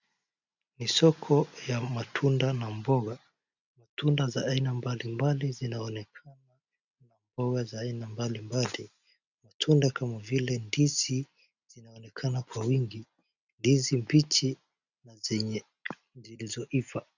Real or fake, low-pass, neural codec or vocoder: real; 7.2 kHz; none